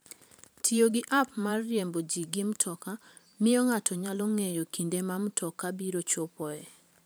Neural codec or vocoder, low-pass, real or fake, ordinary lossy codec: vocoder, 44.1 kHz, 128 mel bands every 512 samples, BigVGAN v2; none; fake; none